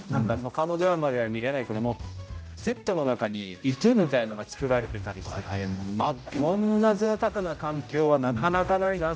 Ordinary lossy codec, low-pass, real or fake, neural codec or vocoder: none; none; fake; codec, 16 kHz, 0.5 kbps, X-Codec, HuBERT features, trained on general audio